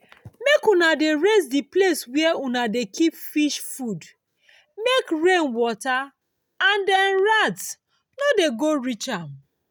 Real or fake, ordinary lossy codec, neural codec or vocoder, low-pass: real; none; none; none